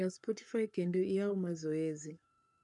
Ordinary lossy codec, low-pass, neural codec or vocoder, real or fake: MP3, 96 kbps; 10.8 kHz; codec, 44.1 kHz, 7.8 kbps, Pupu-Codec; fake